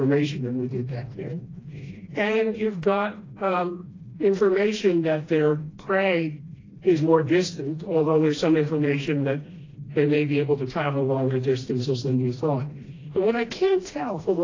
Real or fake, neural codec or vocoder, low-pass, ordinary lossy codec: fake; codec, 16 kHz, 1 kbps, FreqCodec, smaller model; 7.2 kHz; AAC, 32 kbps